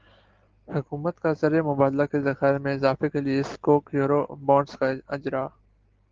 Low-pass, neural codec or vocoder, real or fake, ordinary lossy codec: 7.2 kHz; none; real; Opus, 16 kbps